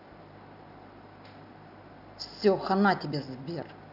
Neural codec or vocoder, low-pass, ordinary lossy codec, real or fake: none; 5.4 kHz; none; real